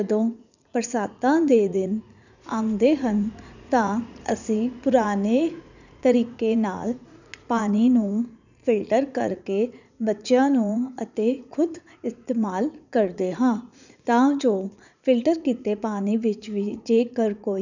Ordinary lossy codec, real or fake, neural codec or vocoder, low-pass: none; fake; vocoder, 44.1 kHz, 80 mel bands, Vocos; 7.2 kHz